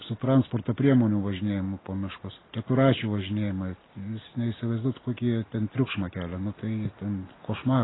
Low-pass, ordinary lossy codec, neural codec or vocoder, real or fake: 7.2 kHz; AAC, 16 kbps; none; real